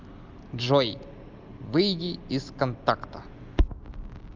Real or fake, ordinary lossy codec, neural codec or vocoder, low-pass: real; Opus, 24 kbps; none; 7.2 kHz